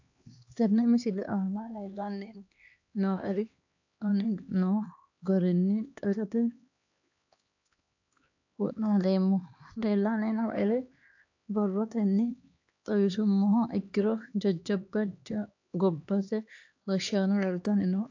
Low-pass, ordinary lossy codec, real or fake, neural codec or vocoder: 7.2 kHz; AAC, 48 kbps; fake; codec, 16 kHz, 2 kbps, X-Codec, HuBERT features, trained on LibriSpeech